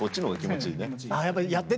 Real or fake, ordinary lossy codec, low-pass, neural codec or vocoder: real; none; none; none